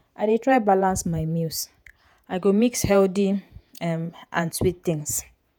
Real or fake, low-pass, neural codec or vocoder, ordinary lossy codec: fake; none; vocoder, 48 kHz, 128 mel bands, Vocos; none